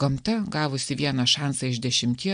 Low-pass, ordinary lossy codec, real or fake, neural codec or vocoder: 9.9 kHz; AAC, 96 kbps; real; none